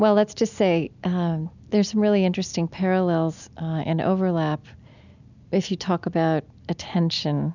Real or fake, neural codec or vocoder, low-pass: real; none; 7.2 kHz